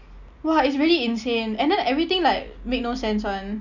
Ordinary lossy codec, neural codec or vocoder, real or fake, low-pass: none; none; real; 7.2 kHz